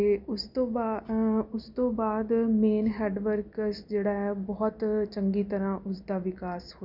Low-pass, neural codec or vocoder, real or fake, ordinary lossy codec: 5.4 kHz; none; real; none